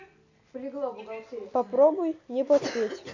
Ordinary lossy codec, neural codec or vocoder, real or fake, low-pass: AAC, 48 kbps; none; real; 7.2 kHz